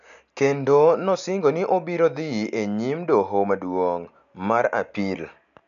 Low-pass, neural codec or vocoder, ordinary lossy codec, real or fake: 7.2 kHz; none; none; real